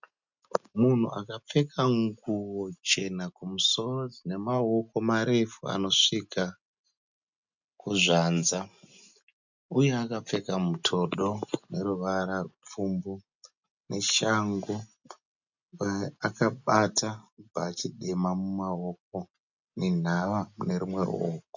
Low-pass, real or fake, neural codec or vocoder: 7.2 kHz; real; none